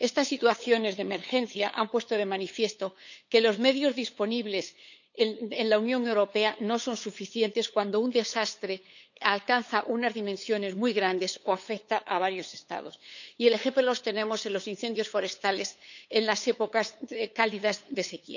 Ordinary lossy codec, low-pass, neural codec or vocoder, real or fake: none; 7.2 kHz; codec, 16 kHz, 8 kbps, FunCodec, trained on LibriTTS, 25 frames a second; fake